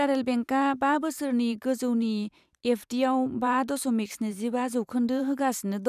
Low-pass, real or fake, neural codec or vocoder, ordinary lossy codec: 14.4 kHz; fake; vocoder, 44.1 kHz, 128 mel bands every 512 samples, BigVGAN v2; none